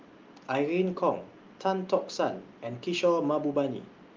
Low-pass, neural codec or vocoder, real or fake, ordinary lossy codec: 7.2 kHz; none; real; Opus, 32 kbps